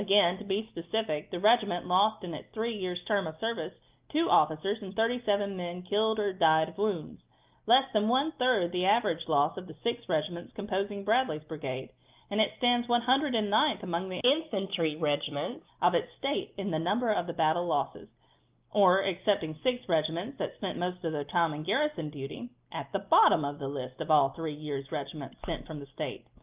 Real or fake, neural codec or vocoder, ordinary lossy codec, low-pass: real; none; Opus, 24 kbps; 3.6 kHz